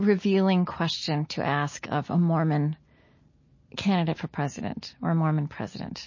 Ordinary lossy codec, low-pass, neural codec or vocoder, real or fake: MP3, 32 kbps; 7.2 kHz; none; real